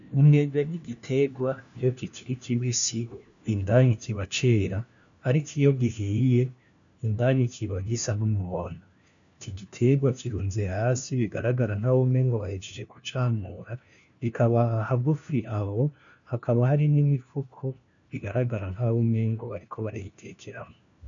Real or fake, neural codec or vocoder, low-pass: fake; codec, 16 kHz, 1 kbps, FunCodec, trained on LibriTTS, 50 frames a second; 7.2 kHz